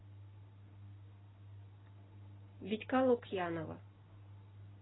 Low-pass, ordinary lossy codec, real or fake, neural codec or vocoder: 7.2 kHz; AAC, 16 kbps; real; none